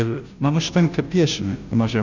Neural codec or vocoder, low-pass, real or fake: codec, 16 kHz, 0.5 kbps, FunCodec, trained on Chinese and English, 25 frames a second; 7.2 kHz; fake